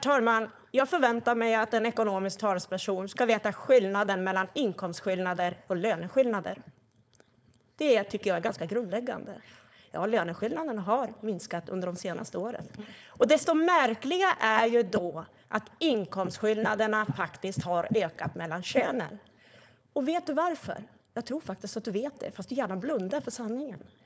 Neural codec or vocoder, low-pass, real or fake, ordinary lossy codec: codec, 16 kHz, 4.8 kbps, FACodec; none; fake; none